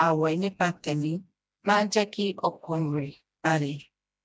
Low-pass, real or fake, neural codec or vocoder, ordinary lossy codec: none; fake; codec, 16 kHz, 1 kbps, FreqCodec, smaller model; none